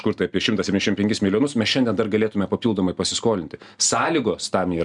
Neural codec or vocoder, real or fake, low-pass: none; real; 10.8 kHz